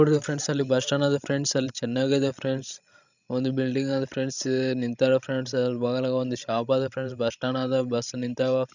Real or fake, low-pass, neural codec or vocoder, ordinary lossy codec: fake; 7.2 kHz; codec, 16 kHz, 16 kbps, FreqCodec, larger model; none